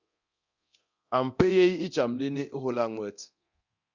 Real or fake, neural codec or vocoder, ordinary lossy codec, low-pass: fake; codec, 24 kHz, 0.9 kbps, DualCodec; Opus, 64 kbps; 7.2 kHz